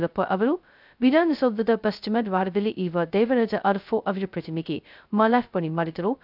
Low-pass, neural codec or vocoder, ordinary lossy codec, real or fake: 5.4 kHz; codec, 16 kHz, 0.2 kbps, FocalCodec; none; fake